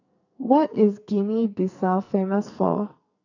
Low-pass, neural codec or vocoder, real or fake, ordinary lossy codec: 7.2 kHz; codec, 44.1 kHz, 2.6 kbps, SNAC; fake; none